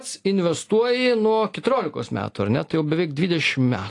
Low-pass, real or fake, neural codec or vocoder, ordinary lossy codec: 10.8 kHz; real; none; AAC, 48 kbps